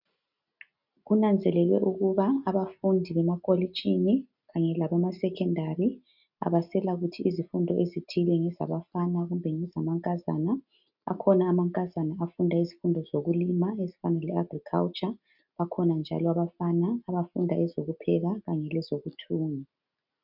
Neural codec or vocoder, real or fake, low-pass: none; real; 5.4 kHz